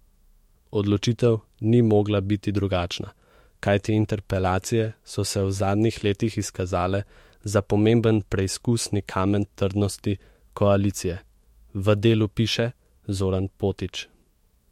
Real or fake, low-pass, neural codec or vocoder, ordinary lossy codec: fake; 19.8 kHz; autoencoder, 48 kHz, 128 numbers a frame, DAC-VAE, trained on Japanese speech; MP3, 64 kbps